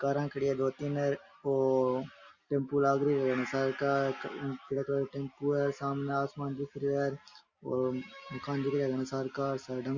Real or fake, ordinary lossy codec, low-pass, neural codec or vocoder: real; Opus, 64 kbps; 7.2 kHz; none